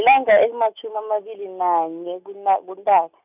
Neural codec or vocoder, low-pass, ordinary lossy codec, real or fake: none; 3.6 kHz; none; real